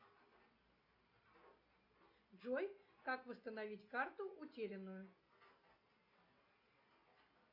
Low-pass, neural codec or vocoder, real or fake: 5.4 kHz; none; real